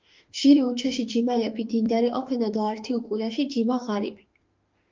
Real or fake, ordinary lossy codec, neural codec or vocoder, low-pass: fake; Opus, 24 kbps; autoencoder, 48 kHz, 32 numbers a frame, DAC-VAE, trained on Japanese speech; 7.2 kHz